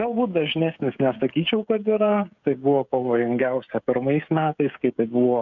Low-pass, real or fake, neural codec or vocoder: 7.2 kHz; fake; codec, 16 kHz, 16 kbps, FreqCodec, smaller model